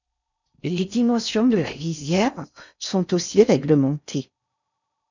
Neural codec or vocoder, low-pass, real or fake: codec, 16 kHz in and 24 kHz out, 0.6 kbps, FocalCodec, streaming, 4096 codes; 7.2 kHz; fake